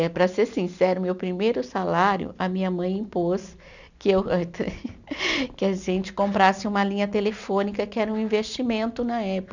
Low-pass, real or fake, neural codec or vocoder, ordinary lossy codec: 7.2 kHz; real; none; none